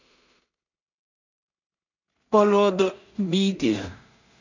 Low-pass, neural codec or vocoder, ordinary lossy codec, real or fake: 7.2 kHz; codec, 16 kHz in and 24 kHz out, 0.4 kbps, LongCat-Audio-Codec, two codebook decoder; none; fake